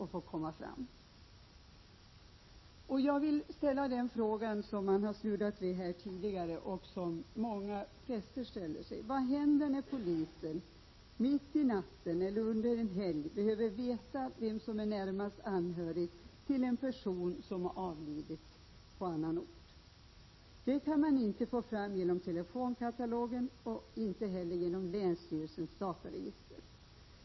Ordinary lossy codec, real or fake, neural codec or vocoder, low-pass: MP3, 24 kbps; real; none; 7.2 kHz